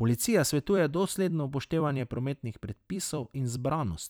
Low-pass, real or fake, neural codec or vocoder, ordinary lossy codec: none; fake; vocoder, 44.1 kHz, 128 mel bands every 256 samples, BigVGAN v2; none